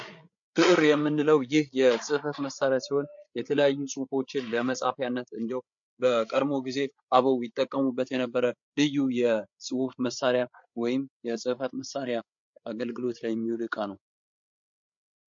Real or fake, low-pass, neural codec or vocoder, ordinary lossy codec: fake; 7.2 kHz; codec, 16 kHz, 8 kbps, FreqCodec, larger model; MP3, 48 kbps